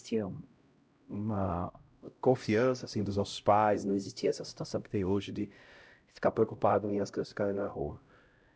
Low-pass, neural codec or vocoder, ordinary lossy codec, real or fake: none; codec, 16 kHz, 0.5 kbps, X-Codec, HuBERT features, trained on LibriSpeech; none; fake